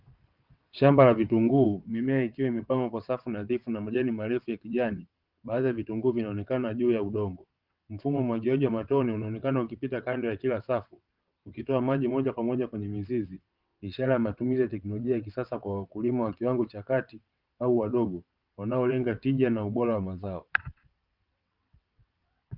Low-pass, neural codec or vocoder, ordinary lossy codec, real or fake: 5.4 kHz; vocoder, 22.05 kHz, 80 mel bands, WaveNeXt; Opus, 32 kbps; fake